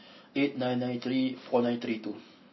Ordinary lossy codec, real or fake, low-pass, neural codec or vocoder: MP3, 24 kbps; real; 7.2 kHz; none